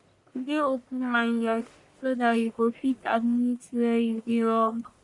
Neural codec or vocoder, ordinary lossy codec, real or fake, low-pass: codec, 44.1 kHz, 1.7 kbps, Pupu-Codec; none; fake; 10.8 kHz